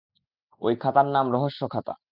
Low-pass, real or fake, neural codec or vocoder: 5.4 kHz; real; none